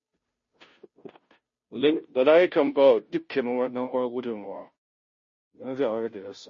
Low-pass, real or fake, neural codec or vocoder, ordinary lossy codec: 7.2 kHz; fake; codec, 16 kHz, 0.5 kbps, FunCodec, trained on Chinese and English, 25 frames a second; MP3, 32 kbps